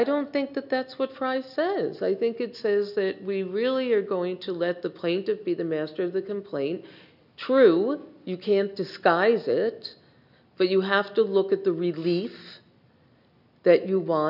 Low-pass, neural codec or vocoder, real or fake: 5.4 kHz; none; real